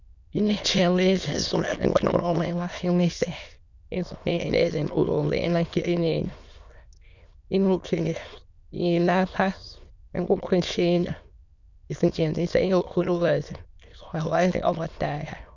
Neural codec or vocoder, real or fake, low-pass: autoencoder, 22.05 kHz, a latent of 192 numbers a frame, VITS, trained on many speakers; fake; 7.2 kHz